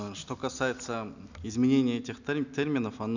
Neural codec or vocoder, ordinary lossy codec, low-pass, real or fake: none; none; 7.2 kHz; real